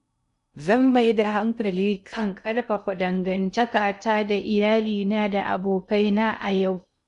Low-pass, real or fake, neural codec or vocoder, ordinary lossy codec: 10.8 kHz; fake; codec, 16 kHz in and 24 kHz out, 0.6 kbps, FocalCodec, streaming, 2048 codes; none